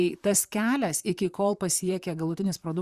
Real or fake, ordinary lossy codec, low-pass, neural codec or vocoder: fake; Opus, 64 kbps; 14.4 kHz; vocoder, 44.1 kHz, 128 mel bands, Pupu-Vocoder